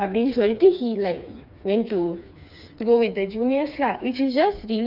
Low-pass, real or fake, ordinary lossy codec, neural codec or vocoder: 5.4 kHz; fake; none; codec, 16 kHz, 4 kbps, FreqCodec, smaller model